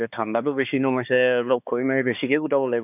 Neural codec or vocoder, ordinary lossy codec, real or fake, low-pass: codec, 16 kHz, 2 kbps, X-Codec, HuBERT features, trained on balanced general audio; none; fake; 3.6 kHz